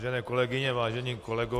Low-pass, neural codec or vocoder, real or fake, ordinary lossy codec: 14.4 kHz; none; real; AAC, 64 kbps